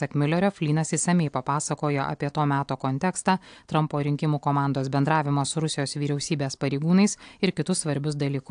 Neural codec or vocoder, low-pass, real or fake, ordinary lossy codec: none; 9.9 kHz; real; AAC, 64 kbps